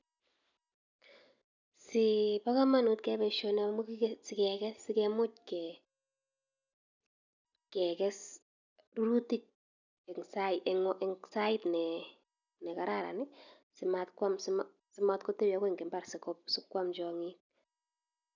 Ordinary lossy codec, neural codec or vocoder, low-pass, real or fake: none; none; 7.2 kHz; real